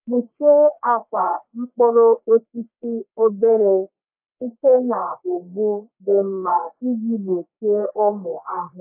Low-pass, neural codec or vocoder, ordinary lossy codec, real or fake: 3.6 kHz; codec, 44.1 kHz, 1.7 kbps, Pupu-Codec; AAC, 32 kbps; fake